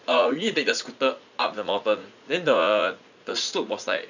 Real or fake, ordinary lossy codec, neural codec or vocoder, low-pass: fake; none; vocoder, 44.1 kHz, 80 mel bands, Vocos; 7.2 kHz